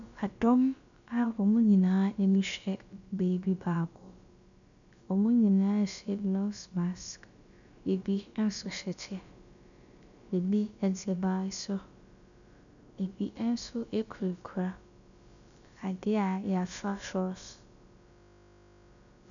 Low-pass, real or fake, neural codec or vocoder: 7.2 kHz; fake; codec, 16 kHz, about 1 kbps, DyCAST, with the encoder's durations